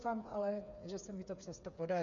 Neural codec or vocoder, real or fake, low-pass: codec, 16 kHz, 8 kbps, FreqCodec, smaller model; fake; 7.2 kHz